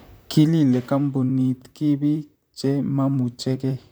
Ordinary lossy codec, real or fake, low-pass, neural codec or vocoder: none; fake; none; vocoder, 44.1 kHz, 128 mel bands, Pupu-Vocoder